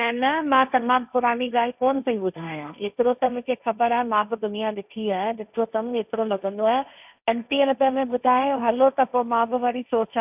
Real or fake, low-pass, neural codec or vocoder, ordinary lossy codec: fake; 3.6 kHz; codec, 16 kHz, 1.1 kbps, Voila-Tokenizer; none